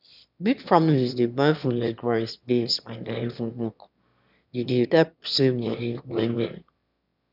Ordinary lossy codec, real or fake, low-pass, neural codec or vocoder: none; fake; 5.4 kHz; autoencoder, 22.05 kHz, a latent of 192 numbers a frame, VITS, trained on one speaker